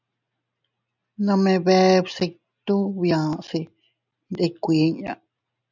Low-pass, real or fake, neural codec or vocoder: 7.2 kHz; real; none